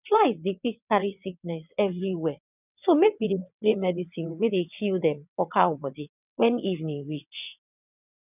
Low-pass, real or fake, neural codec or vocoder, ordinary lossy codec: 3.6 kHz; fake; vocoder, 22.05 kHz, 80 mel bands, WaveNeXt; none